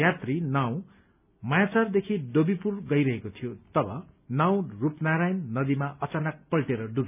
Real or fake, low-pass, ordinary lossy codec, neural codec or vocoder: real; 3.6 kHz; none; none